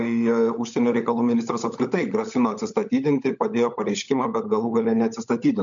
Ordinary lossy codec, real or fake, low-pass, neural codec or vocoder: MP3, 64 kbps; fake; 7.2 kHz; codec, 16 kHz, 16 kbps, FunCodec, trained on LibriTTS, 50 frames a second